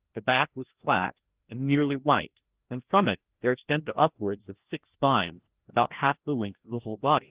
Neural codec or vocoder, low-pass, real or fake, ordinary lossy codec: codec, 16 kHz, 1 kbps, FreqCodec, larger model; 3.6 kHz; fake; Opus, 16 kbps